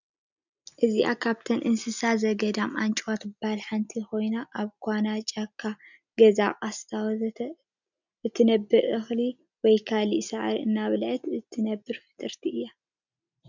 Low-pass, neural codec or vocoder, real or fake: 7.2 kHz; none; real